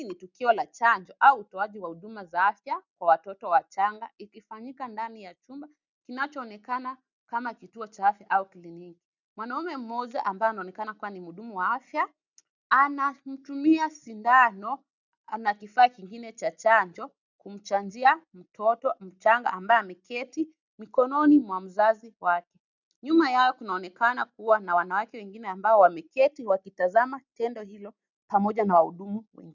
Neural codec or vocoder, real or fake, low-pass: none; real; 7.2 kHz